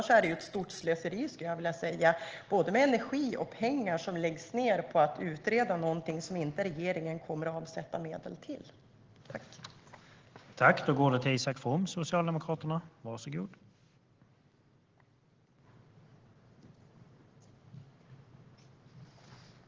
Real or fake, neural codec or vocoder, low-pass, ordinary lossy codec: real; none; 7.2 kHz; Opus, 16 kbps